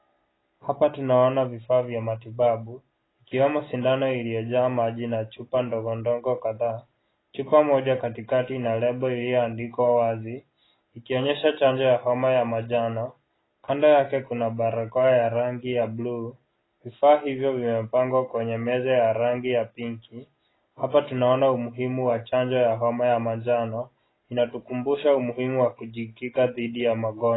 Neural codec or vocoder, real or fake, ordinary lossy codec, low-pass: none; real; AAC, 16 kbps; 7.2 kHz